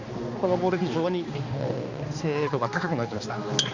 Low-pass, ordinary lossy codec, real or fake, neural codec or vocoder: 7.2 kHz; Opus, 64 kbps; fake; codec, 16 kHz, 2 kbps, X-Codec, HuBERT features, trained on balanced general audio